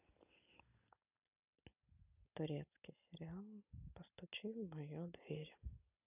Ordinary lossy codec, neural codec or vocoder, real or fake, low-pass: none; none; real; 3.6 kHz